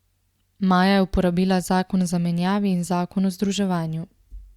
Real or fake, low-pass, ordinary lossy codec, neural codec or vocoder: real; 19.8 kHz; Opus, 64 kbps; none